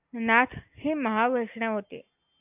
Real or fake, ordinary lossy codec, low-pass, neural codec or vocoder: real; AAC, 32 kbps; 3.6 kHz; none